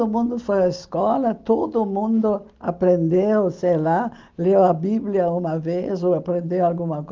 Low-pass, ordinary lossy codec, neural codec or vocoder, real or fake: 7.2 kHz; Opus, 32 kbps; none; real